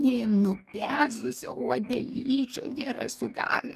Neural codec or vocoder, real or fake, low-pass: codec, 44.1 kHz, 2.6 kbps, DAC; fake; 14.4 kHz